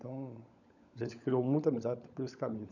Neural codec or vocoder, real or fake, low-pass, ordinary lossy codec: codec, 16 kHz, 16 kbps, FunCodec, trained on LibriTTS, 50 frames a second; fake; 7.2 kHz; none